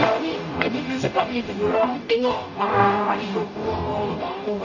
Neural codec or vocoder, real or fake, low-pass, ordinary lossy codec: codec, 44.1 kHz, 0.9 kbps, DAC; fake; 7.2 kHz; none